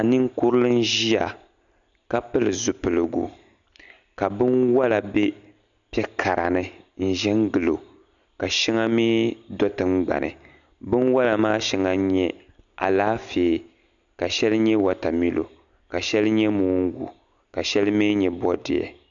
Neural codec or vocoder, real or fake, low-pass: none; real; 7.2 kHz